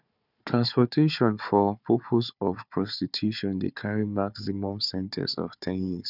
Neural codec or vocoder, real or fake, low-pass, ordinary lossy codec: codec, 16 kHz, 4 kbps, FunCodec, trained on Chinese and English, 50 frames a second; fake; 5.4 kHz; none